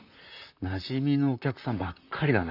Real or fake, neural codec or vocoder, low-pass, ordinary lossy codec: fake; vocoder, 44.1 kHz, 128 mel bands, Pupu-Vocoder; 5.4 kHz; none